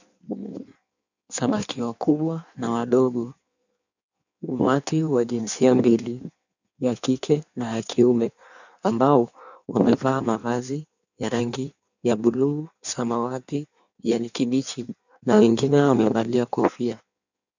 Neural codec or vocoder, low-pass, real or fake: codec, 16 kHz in and 24 kHz out, 1.1 kbps, FireRedTTS-2 codec; 7.2 kHz; fake